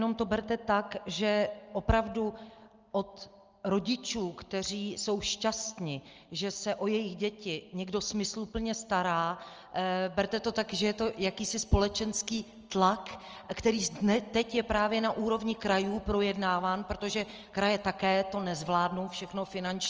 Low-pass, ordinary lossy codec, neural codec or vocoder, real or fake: 7.2 kHz; Opus, 24 kbps; none; real